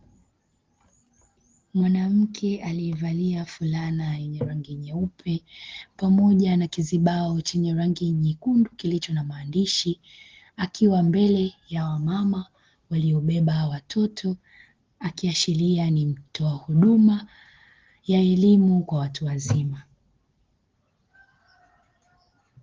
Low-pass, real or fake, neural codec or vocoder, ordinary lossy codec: 7.2 kHz; real; none; Opus, 16 kbps